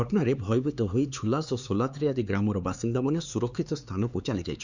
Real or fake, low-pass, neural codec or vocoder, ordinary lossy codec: fake; 7.2 kHz; codec, 16 kHz, 4 kbps, X-Codec, HuBERT features, trained on LibriSpeech; Opus, 64 kbps